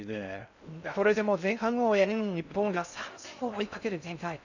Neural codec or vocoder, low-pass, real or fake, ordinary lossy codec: codec, 16 kHz in and 24 kHz out, 0.6 kbps, FocalCodec, streaming, 2048 codes; 7.2 kHz; fake; none